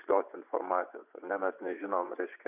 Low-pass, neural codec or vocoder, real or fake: 3.6 kHz; vocoder, 24 kHz, 100 mel bands, Vocos; fake